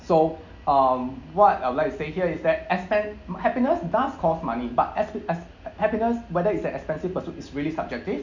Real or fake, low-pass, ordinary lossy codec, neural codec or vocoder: real; 7.2 kHz; none; none